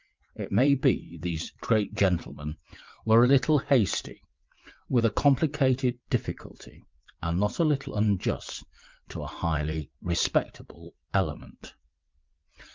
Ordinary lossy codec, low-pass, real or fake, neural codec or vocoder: Opus, 24 kbps; 7.2 kHz; fake; vocoder, 22.05 kHz, 80 mel bands, WaveNeXt